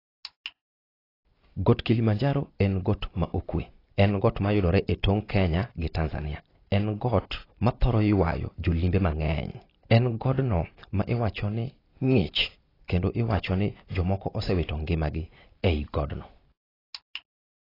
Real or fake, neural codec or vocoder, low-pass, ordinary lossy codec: real; none; 5.4 kHz; AAC, 24 kbps